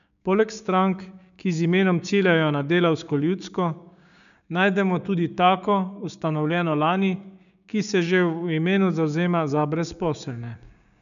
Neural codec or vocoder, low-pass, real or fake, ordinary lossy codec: codec, 16 kHz, 6 kbps, DAC; 7.2 kHz; fake; none